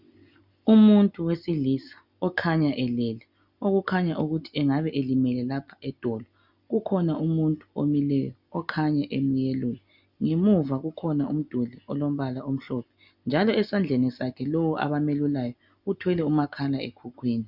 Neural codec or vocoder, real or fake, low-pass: none; real; 5.4 kHz